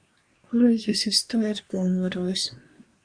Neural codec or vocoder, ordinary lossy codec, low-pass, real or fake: codec, 24 kHz, 1 kbps, SNAC; Opus, 64 kbps; 9.9 kHz; fake